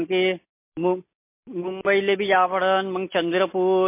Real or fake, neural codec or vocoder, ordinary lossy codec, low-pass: real; none; MP3, 24 kbps; 3.6 kHz